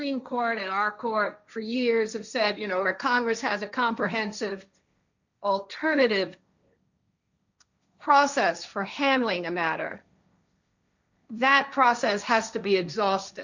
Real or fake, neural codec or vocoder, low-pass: fake; codec, 16 kHz, 1.1 kbps, Voila-Tokenizer; 7.2 kHz